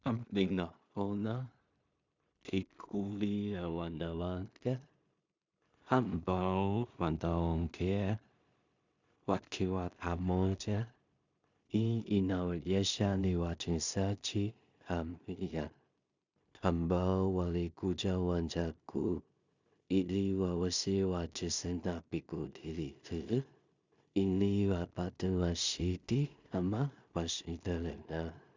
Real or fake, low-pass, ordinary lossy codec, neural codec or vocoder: fake; 7.2 kHz; Opus, 64 kbps; codec, 16 kHz in and 24 kHz out, 0.4 kbps, LongCat-Audio-Codec, two codebook decoder